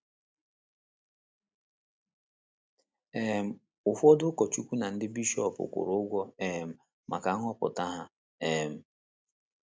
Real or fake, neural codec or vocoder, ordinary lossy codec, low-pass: real; none; none; none